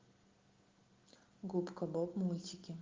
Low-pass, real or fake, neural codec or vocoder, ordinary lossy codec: 7.2 kHz; real; none; Opus, 16 kbps